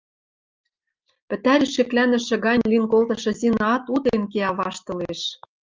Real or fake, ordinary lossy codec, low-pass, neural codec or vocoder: real; Opus, 24 kbps; 7.2 kHz; none